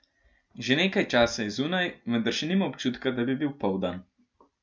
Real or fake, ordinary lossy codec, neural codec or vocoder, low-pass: real; none; none; none